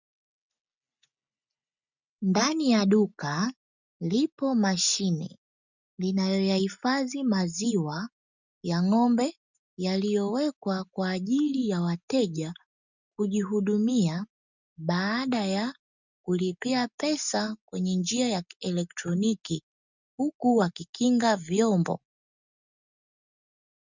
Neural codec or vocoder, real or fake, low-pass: none; real; 7.2 kHz